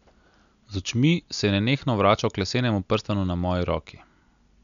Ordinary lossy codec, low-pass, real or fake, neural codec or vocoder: none; 7.2 kHz; real; none